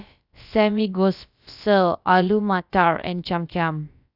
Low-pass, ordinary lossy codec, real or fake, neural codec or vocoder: 5.4 kHz; none; fake; codec, 16 kHz, about 1 kbps, DyCAST, with the encoder's durations